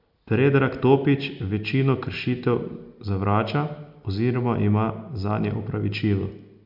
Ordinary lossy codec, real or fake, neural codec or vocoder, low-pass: none; real; none; 5.4 kHz